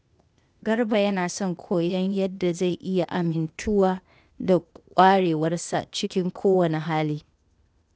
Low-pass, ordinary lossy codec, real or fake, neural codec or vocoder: none; none; fake; codec, 16 kHz, 0.8 kbps, ZipCodec